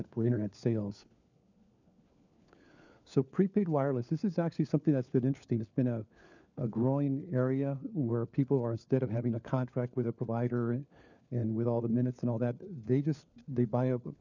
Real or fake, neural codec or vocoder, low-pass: fake; codec, 16 kHz, 4 kbps, FunCodec, trained on LibriTTS, 50 frames a second; 7.2 kHz